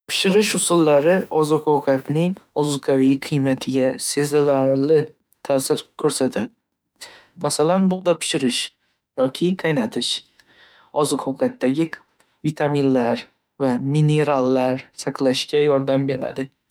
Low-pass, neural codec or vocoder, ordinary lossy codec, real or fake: none; autoencoder, 48 kHz, 32 numbers a frame, DAC-VAE, trained on Japanese speech; none; fake